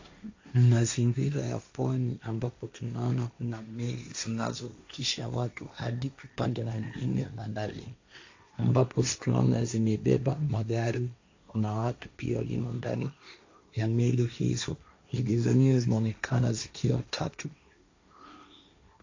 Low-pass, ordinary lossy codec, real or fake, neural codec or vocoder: 7.2 kHz; AAC, 48 kbps; fake; codec, 16 kHz, 1.1 kbps, Voila-Tokenizer